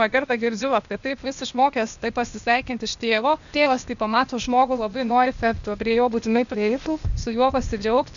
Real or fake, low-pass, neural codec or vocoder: fake; 7.2 kHz; codec, 16 kHz, 0.8 kbps, ZipCodec